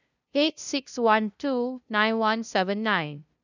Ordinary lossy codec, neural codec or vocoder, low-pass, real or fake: none; codec, 16 kHz, 0.5 kbps, FunCodec, trained on LibriTTS, 25 frames a second; 7.2 kHz; fake